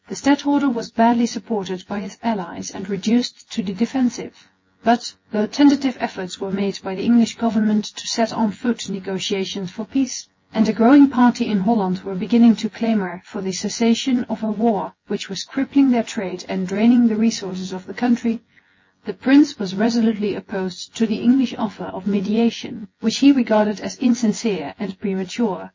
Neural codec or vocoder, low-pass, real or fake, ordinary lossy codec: vocoder, 24 kHz, 100 mel bands, Vocos; 7.2 kHz; fake; MP3, 32 kbps